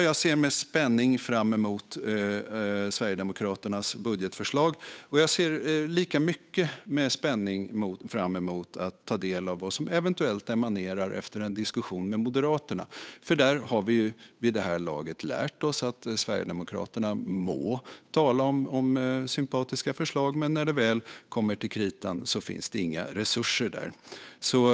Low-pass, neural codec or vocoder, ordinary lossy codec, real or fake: none; codec, 16 kHz, 8 kbps, FunCodec, trained on Chinese and English, 25 frames a second; none; fake